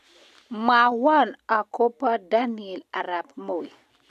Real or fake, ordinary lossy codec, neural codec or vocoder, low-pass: real; MP3, 96 kbps; none; 14.4 kHz